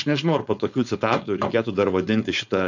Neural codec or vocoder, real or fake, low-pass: codec, 16 kHz, 4.8 kbps, FACodec; fake; 7.2 kHz